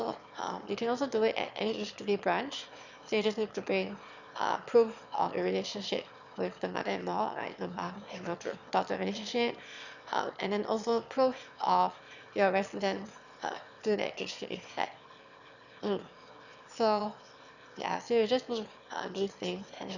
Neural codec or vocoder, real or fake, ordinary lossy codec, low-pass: autoencoder, 22.05 kHz, a latent of 192 numbers a frame, VITS, trained on one speaker; fake; none; 7.2 kHz